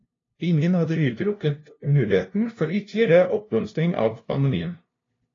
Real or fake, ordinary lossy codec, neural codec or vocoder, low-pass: fake; AAC, 32 kbps; codec, 16 kHz, 0.5 kbps, FunCodec, trained on LibriTTS, 25 frames a second; 7.2 kHz